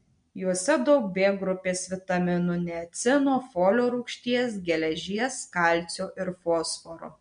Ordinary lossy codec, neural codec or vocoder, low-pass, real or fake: MP3, 64 kbps; none; 9.9 kHz; real